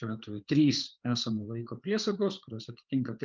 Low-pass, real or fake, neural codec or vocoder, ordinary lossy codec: 7.2 kHz; fake; codec, 16 kHz, 4 kbps, FreqCodec, larger model; Opus, 32 kbps